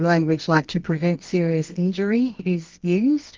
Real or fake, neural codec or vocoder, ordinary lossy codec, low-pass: fake; codec, 24 kHz, 0.9 kbps, WavTokenizer, medium music audio release; Opus, 32 kbps; 7.2 kHz